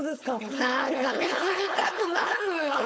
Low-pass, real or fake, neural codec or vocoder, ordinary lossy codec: none; fake; codec, 16 kHz, 4.8 kbps, FACodec; none